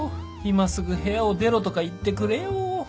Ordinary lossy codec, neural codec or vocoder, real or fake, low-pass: none; none; real; none